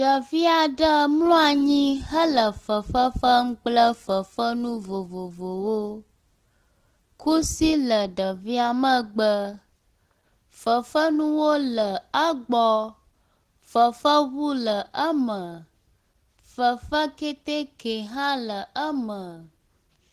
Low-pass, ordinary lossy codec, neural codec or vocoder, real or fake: 14.4 kHz; Opus, 16 kbps; none; real